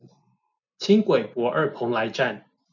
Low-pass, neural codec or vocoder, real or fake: 7.2 kHz; none; real